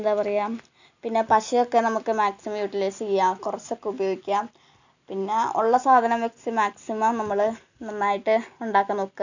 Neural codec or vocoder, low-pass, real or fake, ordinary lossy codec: none; 7.2 kHz; real; none